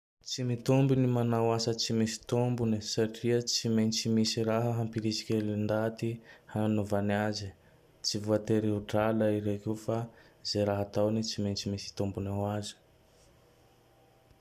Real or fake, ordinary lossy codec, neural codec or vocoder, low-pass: real; none; none; 14.4 kHz